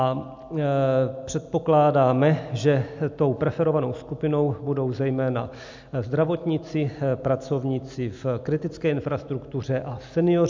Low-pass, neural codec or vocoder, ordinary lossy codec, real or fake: 7.2 kHz; none; MP3, 64 kbps; real